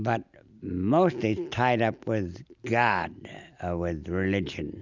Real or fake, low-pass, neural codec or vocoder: real; 7.2 kHz; none